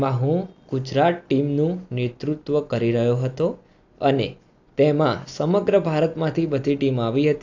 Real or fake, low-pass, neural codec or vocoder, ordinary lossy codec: real; 7.2 kHz; none; AAC, 48 kbps